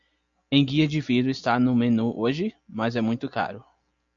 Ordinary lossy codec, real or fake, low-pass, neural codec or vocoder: MP3, 48 kbps; real; 7.2 kHz; none